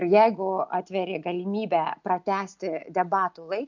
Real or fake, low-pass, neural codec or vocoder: real; 7.2 kHz; none